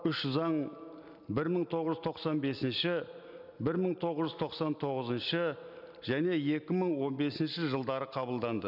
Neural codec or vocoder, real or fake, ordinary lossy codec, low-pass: none; real; none; 5.4 kHz